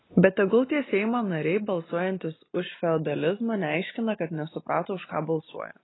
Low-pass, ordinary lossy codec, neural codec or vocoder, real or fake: 7.2 kHz; AAC, 16 kbps; none; real